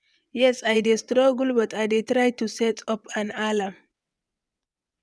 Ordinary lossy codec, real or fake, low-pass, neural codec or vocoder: none; fake; none; vocoder, 22.05 kHz, 80 mel bands, WaveNeXt